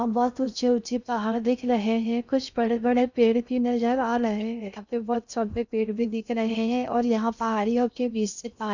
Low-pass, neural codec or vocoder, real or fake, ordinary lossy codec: 7.2 kHz; codec, 16 kHz in and 24 kHz out, 0.6 kbps, FocalCodec, streaming, 2048 codes; fake; none